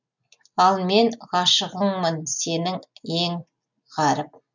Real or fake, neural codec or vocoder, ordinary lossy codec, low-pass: real; none; none; 7.2 kHz